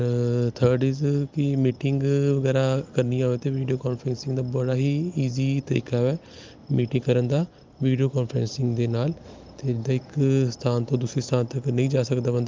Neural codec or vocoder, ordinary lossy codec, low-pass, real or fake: none; Opus, 24 kbps; 7.2 kHz; real